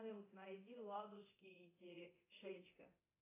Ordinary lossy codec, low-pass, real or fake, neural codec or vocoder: AAC, 24 kbps; 3.6 kHz; fake; codec, 24 kHz, 0.9 kbps, DualCodec